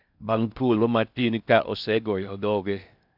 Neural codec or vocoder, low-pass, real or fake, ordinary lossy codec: codec, 16 kHz in and 24 kHz out, 0.6 kbps, FocalCodec, streaming, 4096 codes; 5.4 kHz; fake; none